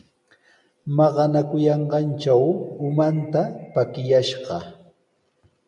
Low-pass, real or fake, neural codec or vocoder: 10.8 kHz; real; none